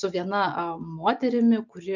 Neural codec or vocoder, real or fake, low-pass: none; real; 7.2 kHz